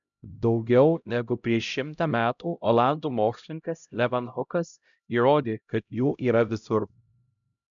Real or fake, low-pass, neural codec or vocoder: fake; 7.2 kHz; codec, 16 kHz, 0.5 kbps, X-Codec, HuBERT features, trained on LibriSpeech